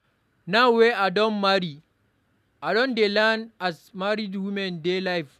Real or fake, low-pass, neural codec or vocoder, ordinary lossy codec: real; 14.4 kHz; none; none